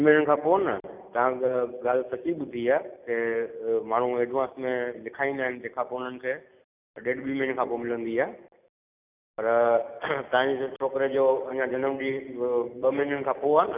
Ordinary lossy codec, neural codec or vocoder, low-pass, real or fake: none; none; 3.6 kHz; real